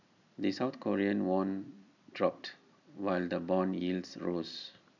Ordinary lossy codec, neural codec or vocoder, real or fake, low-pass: none; none; real; 7.2 kHz